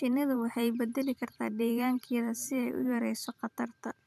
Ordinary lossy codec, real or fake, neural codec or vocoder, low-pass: MP3, 96 kbps; fake; vocoder, 44.1 kHz, 128 mel bands every 512 samples, BigVGAN v2; 14.4 kHz